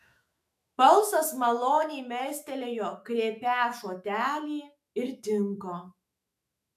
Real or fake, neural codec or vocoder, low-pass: fake; autoencoder, 48 kHz, 128 numbers a frame, DAC-VAE, trained on Japanese speech; 14.4 kHz